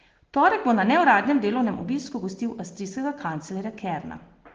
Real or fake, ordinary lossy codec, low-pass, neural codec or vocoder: real; Opus, 16 kbps; 7.2 kHz; none